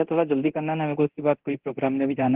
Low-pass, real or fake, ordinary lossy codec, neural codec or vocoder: 3.6 kHz; fake; Opus, 16 kbps; codec, 24 kHz, 0.9 kbps, DualCodec